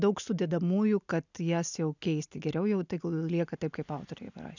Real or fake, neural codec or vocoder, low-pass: real; none; 7.2 kHz